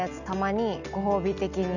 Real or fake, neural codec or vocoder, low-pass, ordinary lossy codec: real; none; 7.2 kHz; none